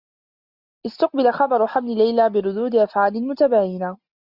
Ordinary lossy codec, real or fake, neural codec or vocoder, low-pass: Opus, 64 kbps; real; none; 5.4 kHz